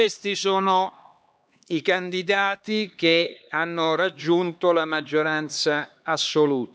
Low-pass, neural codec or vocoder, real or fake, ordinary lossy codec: none; codec, 16 kHz, 2 kbps, X-Codec, HuBERT features, trained on LibriSpeech; fake; none